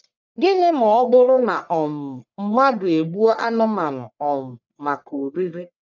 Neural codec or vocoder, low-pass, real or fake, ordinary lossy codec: codec, 44.1 kHz, 1.7 kbps, Pupu-Codec; 7.2 kHz; fake; none